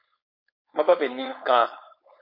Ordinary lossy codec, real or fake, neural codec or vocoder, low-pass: MP3, 32 kbps; fake; codec, 16 kHz, 4.8 kbps, FACodec; 5.4 kHz